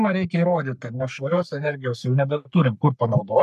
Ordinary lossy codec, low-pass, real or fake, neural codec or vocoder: MP3, 96 kbps; 14.4 kHz; fake; codec, 44.1 kHz, 3.4 kbps, Pupu-Codec